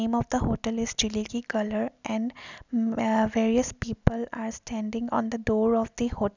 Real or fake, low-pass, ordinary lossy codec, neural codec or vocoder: real; 7.2 kHz; none; none